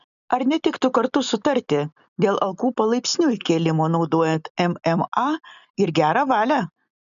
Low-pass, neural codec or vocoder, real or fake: 7.2 kHz; none; real